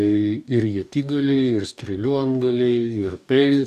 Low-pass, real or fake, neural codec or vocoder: 14.4 kHz; fake; codec, 44.1 kHz, 2.6 kbps, DAC